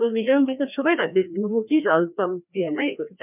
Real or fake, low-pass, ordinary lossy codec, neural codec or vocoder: fake; 3.6 kHz; none; codec, 16 kHz, 1 kbps, FreqCodec, larger model